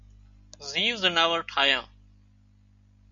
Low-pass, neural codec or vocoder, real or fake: 7.2 kHz; none; real